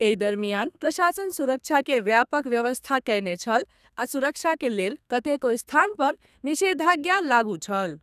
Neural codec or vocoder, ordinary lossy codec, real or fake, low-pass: codec, 32 kHz, 1.9 kbps, SNAC; none; fake; 14.4 kHz